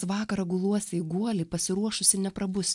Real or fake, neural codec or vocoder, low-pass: real; none; 10.8 kHz